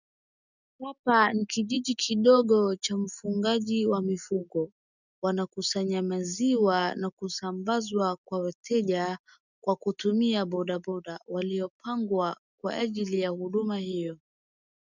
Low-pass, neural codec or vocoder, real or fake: 7.2 kHz; none; real